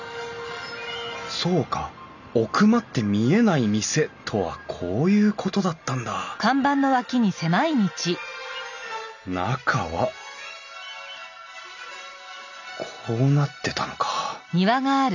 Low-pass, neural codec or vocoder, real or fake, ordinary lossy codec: 7.2 kHz; none; real; none